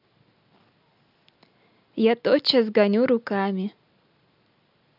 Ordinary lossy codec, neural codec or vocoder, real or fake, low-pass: none; none; real; 5.4 kHz